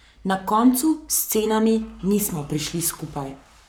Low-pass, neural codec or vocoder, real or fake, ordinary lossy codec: none; codec, 44.1 kHz, 7.8 kbps, Pupu-Codec; fake; none